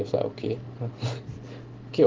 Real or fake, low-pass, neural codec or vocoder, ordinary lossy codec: real; 7.2 kHz; none; Opus, 16 kbps